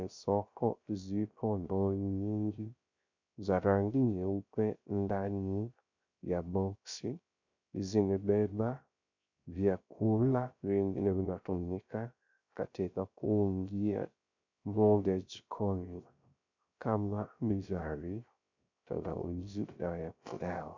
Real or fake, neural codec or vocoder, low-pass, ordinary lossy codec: fake; codec, 16 kHz, 0.3 kbps, FocalCodec; 7.2 kHz; MP3, 64 kbps